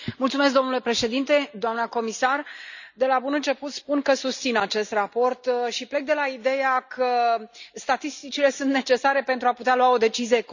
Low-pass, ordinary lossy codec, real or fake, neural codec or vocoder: 7.2 kHz; none; real; none